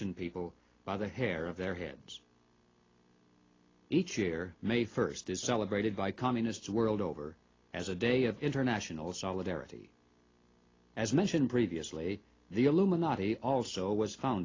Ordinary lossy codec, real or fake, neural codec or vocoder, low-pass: AAC, 32 kbps; real; none; 7.2 kHz